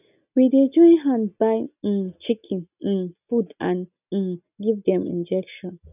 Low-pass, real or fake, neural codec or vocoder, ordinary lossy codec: 3.6 kHz; real; none; none